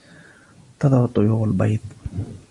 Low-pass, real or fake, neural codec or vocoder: 10.8 kHz; real; none